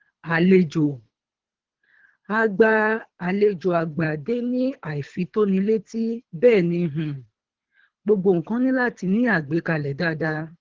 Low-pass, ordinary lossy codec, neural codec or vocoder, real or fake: 7.2 kHz; Opus, 16 kbps; codec, 24 kHz, 3 kbps, HILCodec; fake